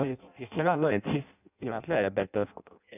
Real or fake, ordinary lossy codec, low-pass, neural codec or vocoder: fake; none; 3.6 kHz; codec, 16 kHz in and 24 kHz out, 0.6 kbps, FireRedTTS-2 codec